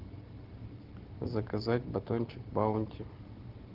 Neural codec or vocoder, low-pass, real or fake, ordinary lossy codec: none; 5.4 kHz; real; Opus, 16 kbps